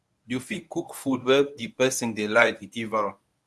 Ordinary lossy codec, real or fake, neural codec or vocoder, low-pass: none; fake; codec, 24 kHz, 0.9 kbps, WavTokenizer, medium speech release version 1; none